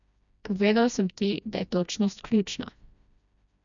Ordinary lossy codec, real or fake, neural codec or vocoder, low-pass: none; fake; codec, 16 kHz, 1 kbps, FreqCodec, smaller model; 7.2 kHz